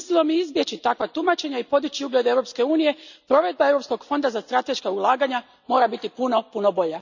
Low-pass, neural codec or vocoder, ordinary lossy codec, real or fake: 7.2 kHz; none; none; real